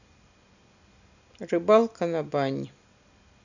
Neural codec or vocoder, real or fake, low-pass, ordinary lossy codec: none; real; 7.2 kHz; none